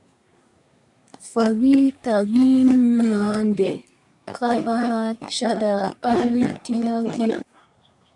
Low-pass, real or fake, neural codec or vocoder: 10.8 kHz; fake; codec, 24 kHz, 1 kbps, SNAC